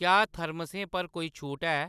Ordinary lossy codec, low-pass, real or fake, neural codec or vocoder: none; 14.4 kHz; real; none